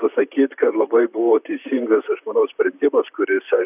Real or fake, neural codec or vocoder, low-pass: fake; vocoder, 44.1 kHz, 128 mel bands, Pupu-Vocoder; 3.6 kHz